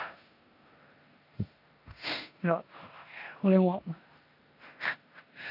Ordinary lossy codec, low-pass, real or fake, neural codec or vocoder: none; 5.4 kHz; fake; codec, 16 kHz in and 24 kHz out, 0.9 kbps, LongCat-Audio-Codec, four codebook decoder